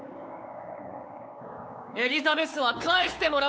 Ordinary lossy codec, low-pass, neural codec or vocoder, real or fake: none; none; codec, 16 kHz, 4 kbps, X-Codec, WavLM features, trained on Multilingual LibriSpeech; fake